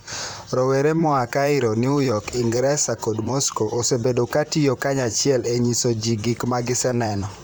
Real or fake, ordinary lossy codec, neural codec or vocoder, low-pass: fake; none; vocoder, 44.1 kHz, 128 mel bands, Pupu-Vocoder; none